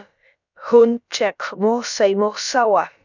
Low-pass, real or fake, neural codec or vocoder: 7.2 kHz; fake; codec, 16 kHz, about 1 kbps, DyCAST, with the encoder's durations